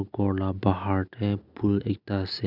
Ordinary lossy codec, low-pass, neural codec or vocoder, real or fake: none; 5.4 kHz; none; real